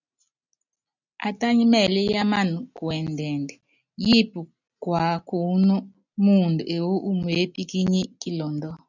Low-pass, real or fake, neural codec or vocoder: 7.2 kHz; real; none